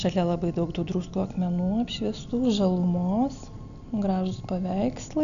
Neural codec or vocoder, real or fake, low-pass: none; real; 7.2 kHz